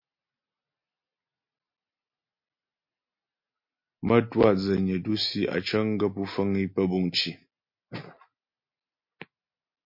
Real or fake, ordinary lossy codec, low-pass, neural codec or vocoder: real; MP3, 32 kbps; 5.4 kHz; none